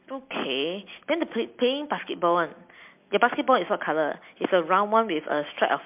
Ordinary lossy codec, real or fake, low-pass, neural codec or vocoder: MP3, 32 kbps; real; 3.6 kHz; none